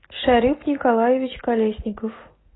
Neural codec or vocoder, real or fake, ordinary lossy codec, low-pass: none; real; AAC, 16 kbps; 7.2 kHz